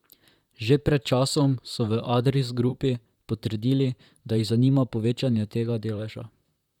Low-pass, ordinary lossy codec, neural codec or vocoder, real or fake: 19.8 kHz; none; vocoder, 44.1 kHz, 128 mel bands, Pupu-Vocoder; fake